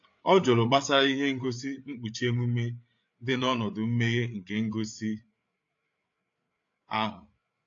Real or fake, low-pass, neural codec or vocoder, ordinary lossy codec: fake; 7.2 kHz; codec, 16 kHz, 8 kbps, FreqCodec, larger model; AAC, 48 kbps